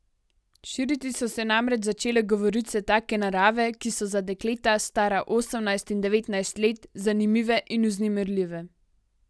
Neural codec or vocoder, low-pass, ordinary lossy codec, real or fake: none; none; none; real